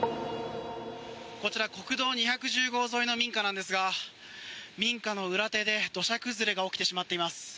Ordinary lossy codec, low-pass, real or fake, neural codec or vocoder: none; none; real; none